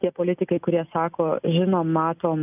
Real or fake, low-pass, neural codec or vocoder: real; 3.6 kHz; none